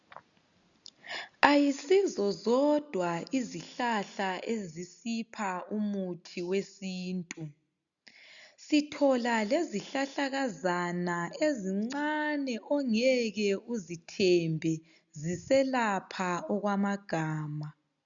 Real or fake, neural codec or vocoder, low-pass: real; none; 7.2 kHz